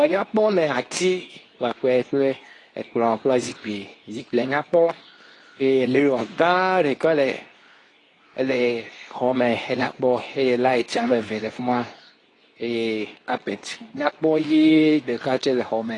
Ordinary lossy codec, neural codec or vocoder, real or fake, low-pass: AAC, 32 kbps; codec, 24 kHz, 0.9 kbps, WavTokenizer, medium speech release version 2; fake; 10.8 kHz